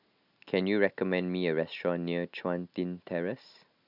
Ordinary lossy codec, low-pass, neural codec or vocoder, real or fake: none; 5.4 kHz; none; real